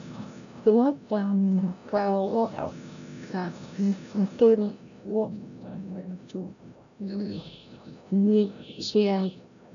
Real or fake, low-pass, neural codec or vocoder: fake; 7.2 kHz; codec, 16 kHz, 0.5 kbps, FreqCodec, larger model